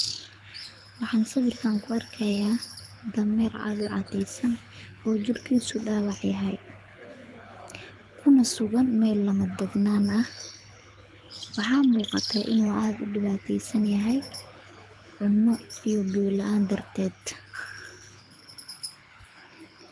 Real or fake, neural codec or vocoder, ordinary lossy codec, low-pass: fake; codec, 24 kHz, 6 kbps, HILCodec; none; none